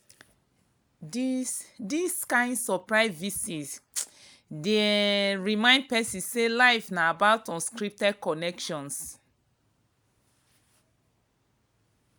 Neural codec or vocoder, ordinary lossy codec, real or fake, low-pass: none; none; real; none